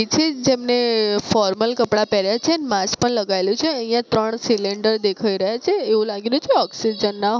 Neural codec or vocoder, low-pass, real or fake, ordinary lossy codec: none; none; real; none